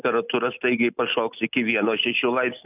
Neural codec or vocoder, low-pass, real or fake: none; 3.6 kHz; real